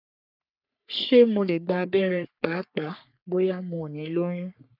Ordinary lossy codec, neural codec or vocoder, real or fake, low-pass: none; codec, 44.1 kHz, 3.4 kbps, Pupu-Codec; fake; 5.4 kHz